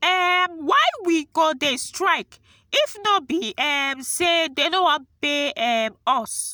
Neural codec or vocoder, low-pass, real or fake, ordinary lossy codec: none; none; real; none